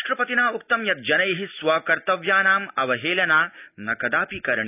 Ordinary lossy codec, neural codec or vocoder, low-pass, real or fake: none; none; 3.6 kHz; real